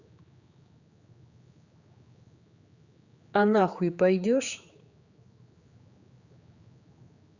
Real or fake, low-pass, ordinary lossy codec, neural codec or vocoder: fake; 7.2 kHz; Opus, 64 kbps; codec, 16 kHz, 4 kbps, X-Codec, HuBERT features, trained on general audio